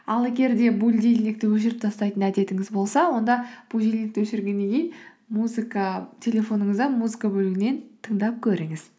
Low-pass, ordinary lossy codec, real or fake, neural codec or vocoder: none; none; real; none